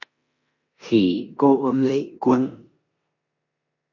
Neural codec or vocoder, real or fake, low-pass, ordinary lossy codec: codec, 16 kHz in and 24 kHz out, 0.9 kbps, LongCat-Audio-Codec, four codebook decoder; fake; 7.2 kHz; MP3, 48 kbps